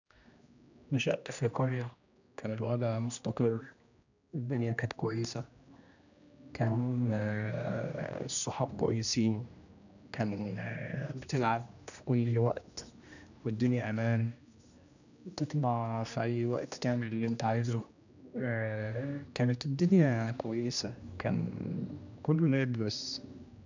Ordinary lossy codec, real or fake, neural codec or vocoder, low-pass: none; fake; codec, 16 kHz, 1 kbps, X-Codec, HuBERT features, trained on general audio; 7.2 kHz